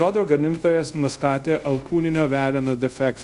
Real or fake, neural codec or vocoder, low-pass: fake; codec, 24 kHz, 0.5 kbps, DualCodec; 10.8 kHz